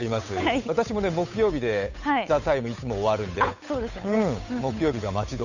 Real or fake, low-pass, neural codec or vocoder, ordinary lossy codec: fake; 7.2 kHz; codec, 16 kHz, 8 kbps, FunCodec, trained on Chinese and English, 25 frames a second; none